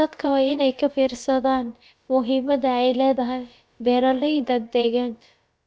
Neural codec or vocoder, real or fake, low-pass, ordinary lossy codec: codec, 16 kHz, about 1 kbps, DyCAST, with the encoder's durations; fake; none; none